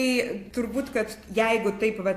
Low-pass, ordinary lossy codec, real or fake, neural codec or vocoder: 14.4 kHz; AAC, 64 kbps; real; none